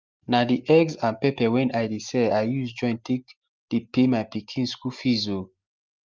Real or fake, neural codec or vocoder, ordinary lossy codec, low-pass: real; none; Opus, 24 kbps; 7.2 kHz